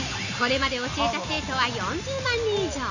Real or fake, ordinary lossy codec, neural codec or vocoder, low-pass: real; none; none; 7.2 kHz